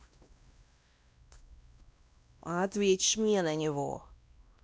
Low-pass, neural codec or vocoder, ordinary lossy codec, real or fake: none; codec, 16 kHz, 1 kbps, X-Codec, WavLM features, trained on Multilingual LibriSpeech; none; fake